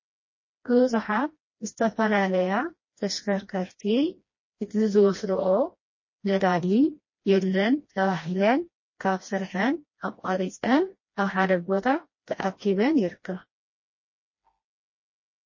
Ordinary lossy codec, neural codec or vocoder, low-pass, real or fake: MP3, 32 kbps; codec, 16 kHz, 1 kbps, FreqCodec, smaller model; 7.2 kHz; fake